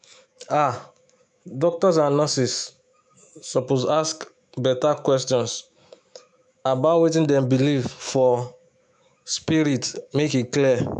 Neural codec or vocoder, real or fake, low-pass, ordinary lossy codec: autoencoder, 48 kHz, 128 numbers a frame, DAC-VAE, trained on Japanese speech; fake; 10.8 kHz; none